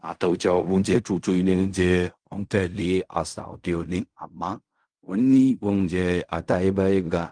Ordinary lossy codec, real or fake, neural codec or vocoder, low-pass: Opus, 32 kbps; fake; codec, 16 kHz in and 24 kHz out, 0.4 kbps, LongCat-Audio-Codec, fine tuned four codebook decoder; 9.9 kHz